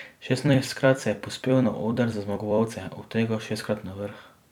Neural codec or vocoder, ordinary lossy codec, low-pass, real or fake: vocoder, 44.1 kHz, 128 mel bands every 256 samples, BigVGAN v2; none; 19.8 kHz; fake